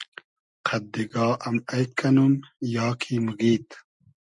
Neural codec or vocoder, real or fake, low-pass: none; real; 10.8 kHz